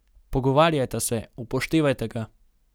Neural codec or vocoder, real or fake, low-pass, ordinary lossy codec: none; real; none; none